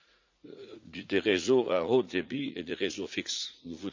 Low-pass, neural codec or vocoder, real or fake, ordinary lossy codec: 7.2 kHz; vocoder, 44.1 kHz, 80 mel bands, Vocos; fake; MP3, 64 kbps